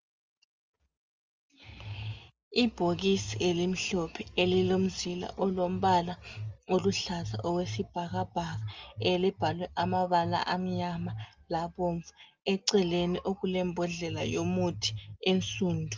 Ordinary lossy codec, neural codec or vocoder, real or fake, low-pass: Opus, 32 kbps; none; real; 7.2 kHz